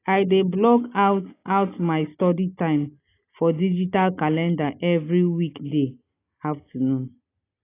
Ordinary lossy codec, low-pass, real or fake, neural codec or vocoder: AAC, 24 kbps; 3.6 kHz; real; none